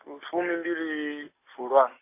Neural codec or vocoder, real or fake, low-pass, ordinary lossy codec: none; real; 3.6 kHz; AAC, 24 kbps